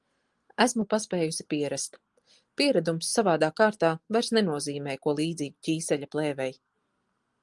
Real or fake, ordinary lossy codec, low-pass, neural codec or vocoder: real; Opus, 24 kbps; 10.8 kHz; none